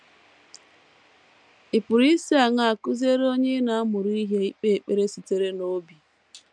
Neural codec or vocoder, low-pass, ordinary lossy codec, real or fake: none; 9.9 kHz; MP3, 96 kbps; real